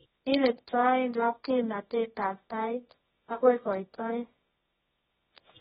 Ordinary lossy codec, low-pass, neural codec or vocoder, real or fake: AAC, 16 kbps; 10.8 kHz; codec, 24 kHz, 0.9 kbps, WavTokenizer, medium music audio release; fake